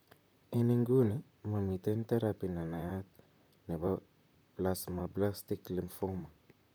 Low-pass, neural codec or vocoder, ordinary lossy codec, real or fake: none; vocoder, 44.1 kHz, 128 mel bands, Pupu-Vocoder; none; fake